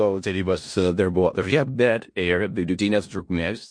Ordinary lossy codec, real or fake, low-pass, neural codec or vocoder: MP3, 48 kbps; fake; 9.9 kHz; codec, 16 kHz in and 24 kHz out, 0.4 kbps, LongCat-Audio-Codec, four codebook decoder